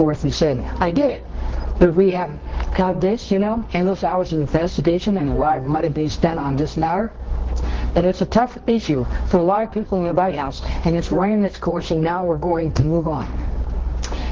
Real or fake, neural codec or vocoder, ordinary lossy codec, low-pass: fake; codec, 24 kHz, 0.9 kbps, WavTokenizer, medium music audio release; Opus, 16 kbps; 7.2 kHz